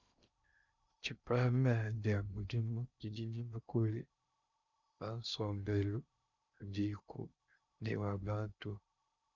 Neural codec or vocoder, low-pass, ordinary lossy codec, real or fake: codec, 16 kHz in and 24 kHz out, 0.6 kbps, FocalCodec, streaming, 2048 codes; 7.2 kHz; Opus, 64 kbps; fake